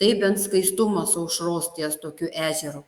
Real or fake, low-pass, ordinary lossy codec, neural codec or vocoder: fake; 19.8 kHz; Opus, 64 kbps; autoencoder, 48 kHz, 128 numbers a frame, DAC-VAE, trained on Japanese speech